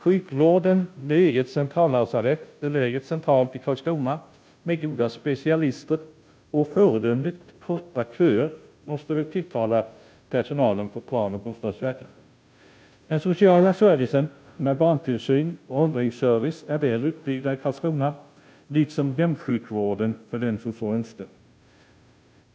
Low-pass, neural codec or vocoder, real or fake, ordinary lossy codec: none; codec, 16 kHz, 0.5 kbps, FunCodec, trained on Chinese and English, 25 frames a second; fake; none